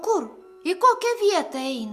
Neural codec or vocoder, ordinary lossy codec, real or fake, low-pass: none; Opus, 64 kbps; real; 14.4 kHz